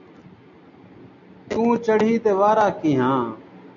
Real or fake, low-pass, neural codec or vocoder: real; 7.2 kHz; none